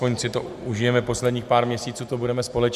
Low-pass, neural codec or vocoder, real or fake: 14.4 kHz; none; real